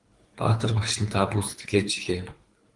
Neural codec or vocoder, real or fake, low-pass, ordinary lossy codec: codec, 24 kHz, 3 kbps, HILCodec; fake; 10.8 kHz; Opus, 24 kbps